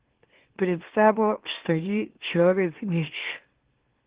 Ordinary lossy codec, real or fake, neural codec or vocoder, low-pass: Opus, 16 kbps; fake; autoencoder, 44.1 kHz, a latent of 192 numbers a frame, MeloTTS; 3.6 kHz